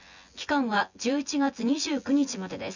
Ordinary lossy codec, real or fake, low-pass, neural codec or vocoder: none; fake; 7.2 kHz; vocoder, 24 kHz, 100 mel bands, Vocos